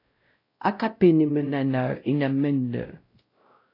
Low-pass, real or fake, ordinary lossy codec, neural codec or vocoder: 5.4 kHz; fake; AAC, 24 kbps; codec, 16 kHz, 0.5 kbps, X-Codec, WavLM features, trained on Multilingual LibriSpeech